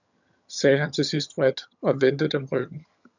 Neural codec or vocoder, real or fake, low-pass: vocoder, 22.05 kHz, 80 mel bands, HiFi-GAN; fake; 7.2 kHz